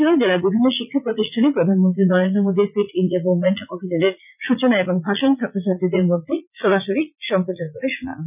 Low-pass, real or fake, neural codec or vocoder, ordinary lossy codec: 3.6 kHz; fake; vocoder, 44.1 kHz, 80 mel bands, Vocos; none